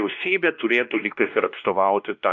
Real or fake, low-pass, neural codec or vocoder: fake; 7.2 kHz; codec, 16 kHz, 1 kbps, X-Codec, WavLM features, trained on Multilingual LibriSpeech